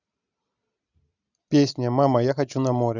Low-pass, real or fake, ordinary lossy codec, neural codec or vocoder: 7.2 kHz; real; none; none